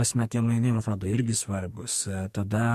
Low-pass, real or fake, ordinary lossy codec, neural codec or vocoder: 14.4 kHz; fake; MP3, 64 kbps; codec, 44.1 kHz, 2.6 kbps, SNAC